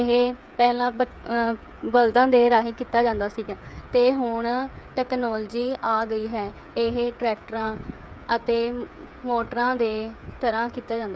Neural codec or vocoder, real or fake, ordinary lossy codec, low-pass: codec, 16 kHz, 8 kbps, FreqCodec, smaller model; fake; none; none